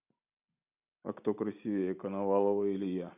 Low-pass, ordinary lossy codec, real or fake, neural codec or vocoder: 3.6 kHz; none; real; none